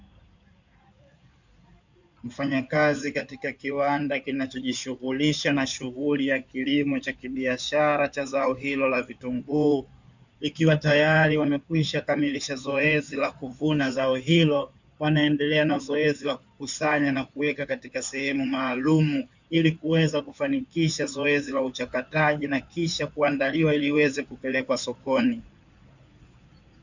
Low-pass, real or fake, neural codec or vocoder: 7.2 kHz; fake; codec, 16 kHz in and 24 kHz out, 2.2 kbps, FireRedTTS-2 codec